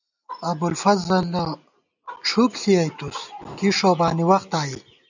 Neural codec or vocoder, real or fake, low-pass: none; real; 7.2 kHz